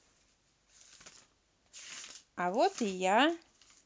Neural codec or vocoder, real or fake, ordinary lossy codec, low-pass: none; real; none; none